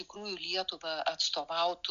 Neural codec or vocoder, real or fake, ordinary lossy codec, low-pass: none; real; MP3, 96 kbps; 7.2 kHz